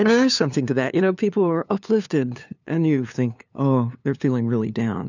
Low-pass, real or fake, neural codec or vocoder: 7.2 kHz; fake; codec, 16 kHz, 2 kbps, FunCodec, trained on LibriTTS, 25 frames a second